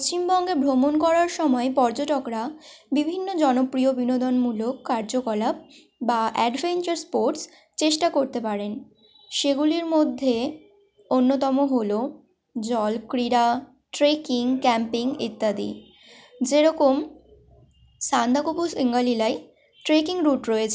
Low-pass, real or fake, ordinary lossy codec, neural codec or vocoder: none; real; none; none